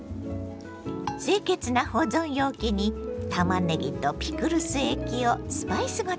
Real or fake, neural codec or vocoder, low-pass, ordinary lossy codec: real; none; none; none